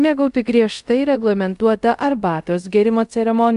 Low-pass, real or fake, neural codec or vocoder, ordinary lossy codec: 10.8 kHz; fake; codec, 24 kHz, 0.5 kbps, DualCodec; MP3, 64 kbps